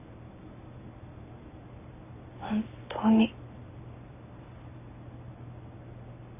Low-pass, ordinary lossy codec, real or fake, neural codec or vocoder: 3.6 kHz; none; real; none